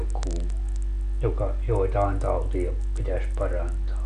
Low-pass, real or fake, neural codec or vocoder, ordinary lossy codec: 10.8 kHz; real; none; none